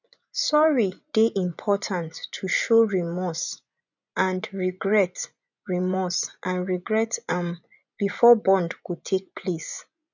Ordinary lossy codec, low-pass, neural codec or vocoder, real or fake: none; 7.2 kHz; vocoder, 24 kHz, 100 mel bands, Vocos; fake